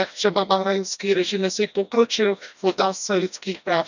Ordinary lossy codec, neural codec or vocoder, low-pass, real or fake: none; codec, 16 kHz, 1 kbps, FreqCodec, smaller model; 7.2 kHz; fake